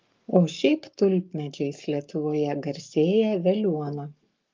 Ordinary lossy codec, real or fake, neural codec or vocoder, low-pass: Opus, 24 kbps; fake; codec, 44.1 kHz, 7.8 kbps, Pupu-Codec; 7.2 kHz